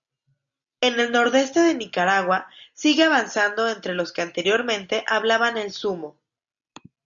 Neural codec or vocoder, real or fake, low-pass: none; real; 7.2 kHz